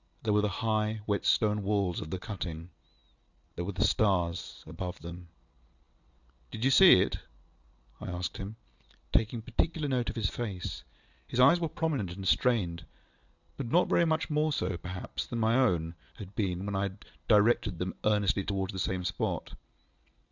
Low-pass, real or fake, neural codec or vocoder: 7.2 kHz; real; none